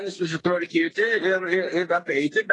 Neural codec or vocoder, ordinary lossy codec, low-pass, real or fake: codec, 32 kHz, 1.9 kbps, SNAC; AAC, 32 kbps; 10.8 kHz; fake